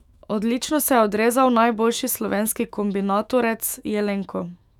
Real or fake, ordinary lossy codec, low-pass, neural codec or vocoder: fake; none; 19.8 kHz; autoencoder, 48 kHz, 128 numbers a frame, DAC-VAE, trained on Japanese speech